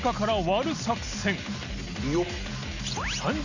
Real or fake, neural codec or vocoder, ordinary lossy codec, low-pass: real; none; none; 7.2 kHz